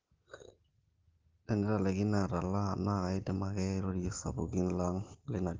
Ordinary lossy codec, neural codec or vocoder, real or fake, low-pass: Opus, 16 kbps; none; real; 7.2 kHz